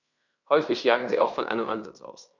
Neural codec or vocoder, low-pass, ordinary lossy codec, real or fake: codec, 16 kHz in and 24 kHz out, 0.9 kbps, LongCat-Audio-Codec, fine tuned four codebook decoder; 7.2 kHz; none; fake